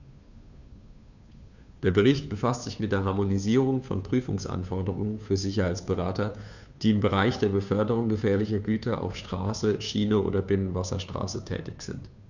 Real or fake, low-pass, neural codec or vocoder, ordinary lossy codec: fake; 7.2 kHz; codec, 16 kHz, 2 kbps, FunCodec, trained on Chinese and English, 25 frames a second; none